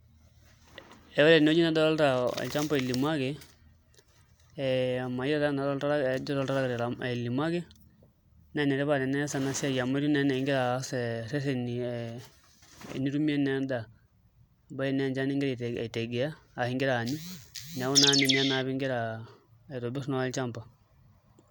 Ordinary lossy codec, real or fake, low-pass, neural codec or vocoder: none; real; none; none